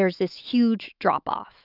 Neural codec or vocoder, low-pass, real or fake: autoencoder, 48 kHz, 128 numbers a frame, DAC-VAE, trained on Japanese speech; 5.4 kHz; fake